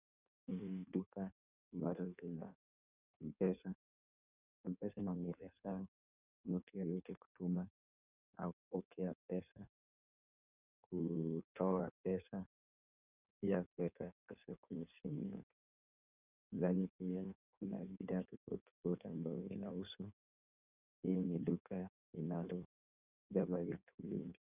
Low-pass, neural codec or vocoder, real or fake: 3.6 kHz; codec, 16 kHz in and 24 kHz out, 1.1 kbps, FireRedTTS-2 codec; fake